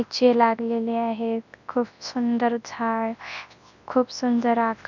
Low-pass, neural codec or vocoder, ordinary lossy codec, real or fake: 7.2 kHz; codec, 24 kHz, 0.9 kbps, WavTokenizer, large speech release; none; fake